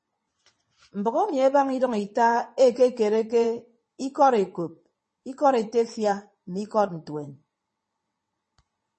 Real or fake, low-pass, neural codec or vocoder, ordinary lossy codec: fake; 9.9 kHz; vocoder, 22.05 kHz, 80 mel bands, WaveNeXt; MP3, 32 kbps